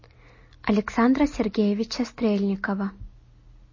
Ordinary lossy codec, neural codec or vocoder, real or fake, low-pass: MP3, 32 kbps; none; real; 7.2 kHz